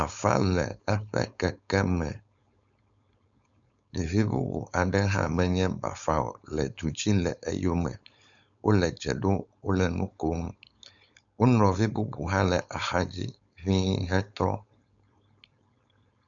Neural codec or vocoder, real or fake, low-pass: codec, 16 kHz, 4.8 kbps, FACodec; fake; 7.2 kHz